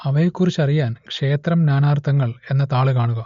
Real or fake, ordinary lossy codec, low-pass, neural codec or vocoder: real; MP3, 48 kbps; 7.2 kHz; none